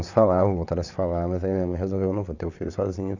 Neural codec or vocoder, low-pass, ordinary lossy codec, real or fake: vocoder, 22.05 kHz, 80 mel bands, WaveNeXt; 7.2 kHz; none; fake